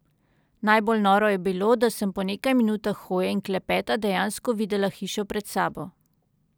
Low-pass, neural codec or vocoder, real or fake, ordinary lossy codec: none; none; real; none